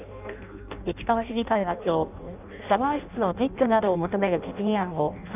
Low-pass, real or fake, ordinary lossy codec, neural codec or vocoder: 3.6 kHz; fake; none; codec, 16 kHz in and 24 kHz out, 0.6 kbps, FireRedTTS-2 codec